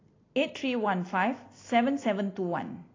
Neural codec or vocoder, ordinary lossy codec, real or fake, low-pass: none; AAC, 32 kbps; real; 7.2 kHz